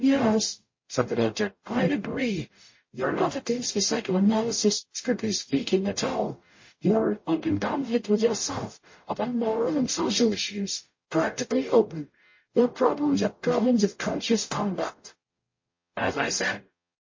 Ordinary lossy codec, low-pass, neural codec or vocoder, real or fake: MP3, 32 kbps; 7.2 kHz; codec, 44.1 kHz, 0.9 kbps, DAC; fake